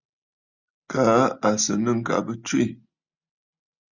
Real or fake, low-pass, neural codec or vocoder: real; 7.2 kHz; none